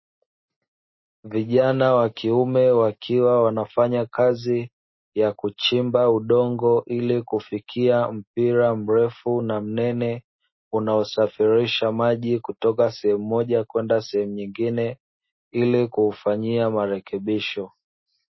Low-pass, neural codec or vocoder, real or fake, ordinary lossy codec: 7.2 kHz; none; real; MP3, 24 kbps